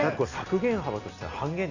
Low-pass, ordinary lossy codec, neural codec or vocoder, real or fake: 7.2 kHz; none; none; real